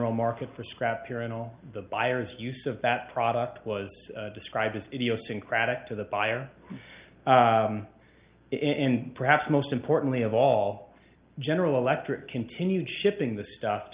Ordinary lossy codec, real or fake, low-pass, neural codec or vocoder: Opus, 24 kbps; real; 3.6 kHz; none